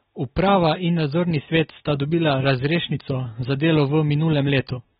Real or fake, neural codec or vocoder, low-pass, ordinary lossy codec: real; none; 19.8 kHz; AAC, 16 kbps